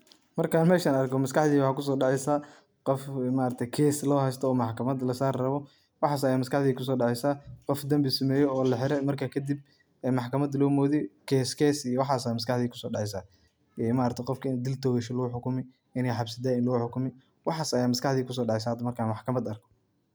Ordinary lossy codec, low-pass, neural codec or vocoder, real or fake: none; none; none; real